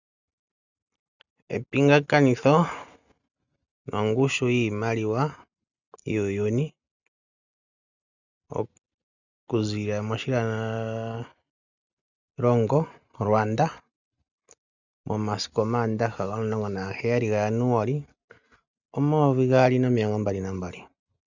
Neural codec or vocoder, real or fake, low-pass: none; real; 7.2 kHz